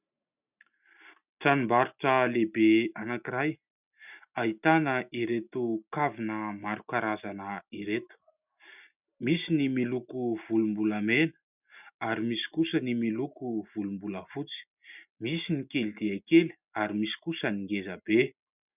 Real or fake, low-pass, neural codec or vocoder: real; 3.6 kHz; none